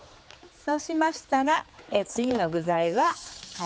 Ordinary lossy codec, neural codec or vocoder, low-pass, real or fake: none; codec, 16 kHz, 4 kbps, X-Codec, HuBERT features, trained on general audio; none; fake